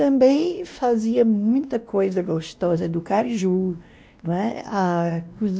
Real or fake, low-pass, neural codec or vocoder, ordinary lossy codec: fake; none; codec, 16 kHz, 1 kbps, X-Codec, WavLM features, trained on Multilingual LibriSpeech; none